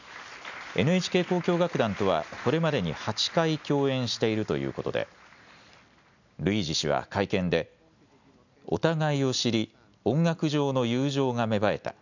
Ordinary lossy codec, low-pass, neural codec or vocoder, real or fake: none; 7.2 kHz; none; real